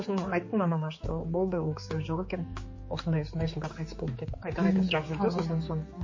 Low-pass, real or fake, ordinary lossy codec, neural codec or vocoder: 7.2 kHz; fake; MP3, 32 kbps; codec, 16 kHz, 4 kbps, X-Codec, HuBERT features, trained on general audio